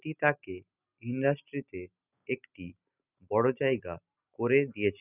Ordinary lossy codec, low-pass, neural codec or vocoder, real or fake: none; 3.6 kHz; none; real